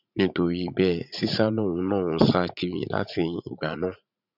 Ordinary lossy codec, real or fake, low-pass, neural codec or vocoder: none; fake; 5.4 kHz; vocoder, 44.1 kHz, 80 mel bands, Vocos